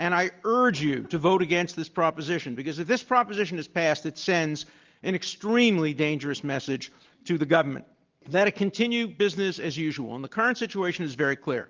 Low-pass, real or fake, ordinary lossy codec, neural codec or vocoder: 7.2 kHz; real; Opus, 32 kbps; none